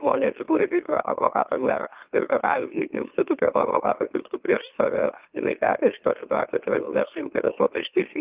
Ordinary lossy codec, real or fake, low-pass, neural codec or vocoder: Opus, 24 kbps; fake; 3.6 kHz; autoencoder, 44.1 kHz, a latent of 192 numbers a frame, MeloTTS